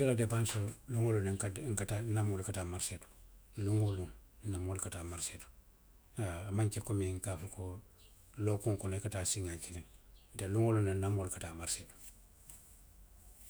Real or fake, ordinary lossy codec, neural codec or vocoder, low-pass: fake; none; autoencoder, 48 kHz, 128 numbers a frame, DAC-VAE, trained on Japanese speech; none